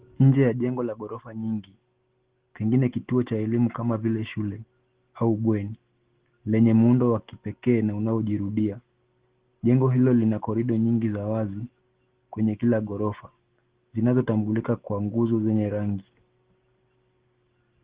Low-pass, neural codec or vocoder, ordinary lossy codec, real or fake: 3.6 kHz; none; Opus, 16 kbps; real